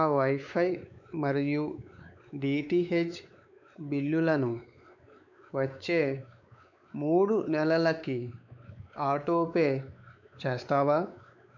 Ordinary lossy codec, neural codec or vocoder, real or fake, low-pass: none; codec, 16 kHz, 4 kbps, X-Codec, WavLM features, trained on Multilingual LibriSpeech; fake; 7.2 kHz